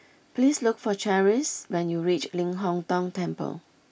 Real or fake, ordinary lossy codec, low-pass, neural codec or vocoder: real; none; none; none